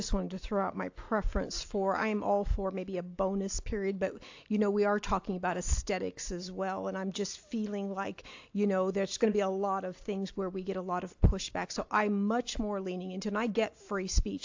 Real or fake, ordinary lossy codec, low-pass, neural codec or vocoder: real; AAC, 48 kbps; 7.2 kHz; none